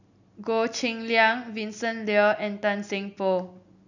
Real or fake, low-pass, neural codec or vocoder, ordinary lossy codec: real; 7.2 kHz; none; none